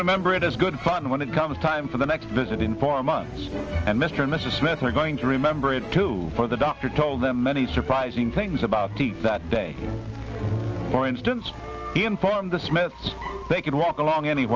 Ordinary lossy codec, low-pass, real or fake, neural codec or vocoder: Opus, 24 kbps; 7.2 kHz; real; none